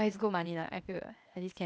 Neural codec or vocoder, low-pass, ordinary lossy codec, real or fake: codec, 16 kHz, 0.8 kbps, ZipCodec; none; none; fake